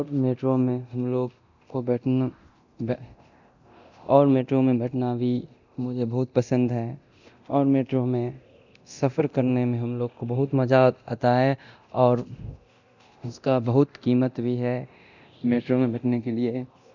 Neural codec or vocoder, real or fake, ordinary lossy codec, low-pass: codec, 24 kHz, 0.9 kbps, DualCodec; fake; none; 7.2 kHz